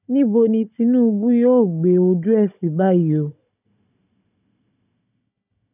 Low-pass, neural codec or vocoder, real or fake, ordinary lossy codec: 3.6 kHz; codec, 16 kHz, 16 kbps, FunCodec, trained on Chinese and English, 50 frames a second; fake; none